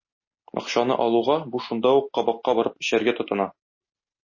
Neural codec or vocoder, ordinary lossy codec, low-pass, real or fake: none; MP3, 32 kbps; 7.2 kHz; real